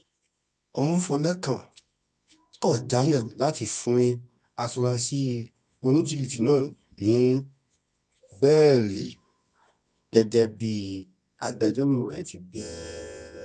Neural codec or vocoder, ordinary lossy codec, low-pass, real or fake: codec, 24 kHz, 0.9 kbps, WavTokenizer, medium music audio release; none; none; fake